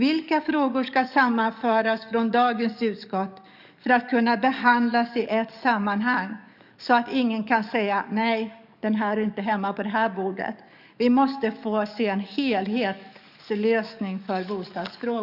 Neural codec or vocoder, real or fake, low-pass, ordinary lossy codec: codec, 44.1 kHz, 7.8 kbps, DAC; fake; 5.4 kHz; none